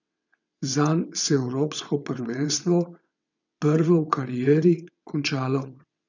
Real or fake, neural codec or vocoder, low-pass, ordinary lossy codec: fake; vocoder, 22.05 kHz, 80 mel bands, WaveNeXt; 7.2 kHz; none